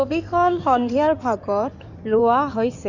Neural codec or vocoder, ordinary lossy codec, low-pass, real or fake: codec, 16 kHz in and 24 kHz out, 2.2 kbps, FireRedTTS-2 codec; none; 7.2 kHz; fake